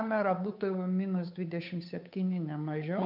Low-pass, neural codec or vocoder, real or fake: 5.4 kHz; codec, 16 kHz, 8 kbps, FunCodec, trained on Chinese and English, 25 frames a second; fake